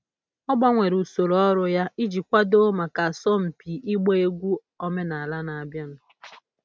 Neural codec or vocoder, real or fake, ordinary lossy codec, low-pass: none; real; none; none